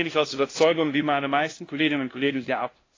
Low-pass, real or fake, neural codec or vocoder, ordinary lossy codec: 7.2 kHz; fake; codec, 16 kHz, 0.5 kbps, FunCodec, trained on LibriTTS, 25 frames a second; AAC, 32 kbps